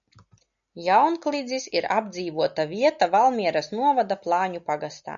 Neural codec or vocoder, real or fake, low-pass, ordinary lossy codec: none; real; 7.2 kHz; MP3, 96 kbps